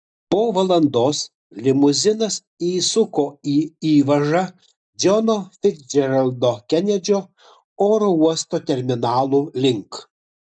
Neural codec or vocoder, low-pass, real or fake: none; 9.9 kHz; real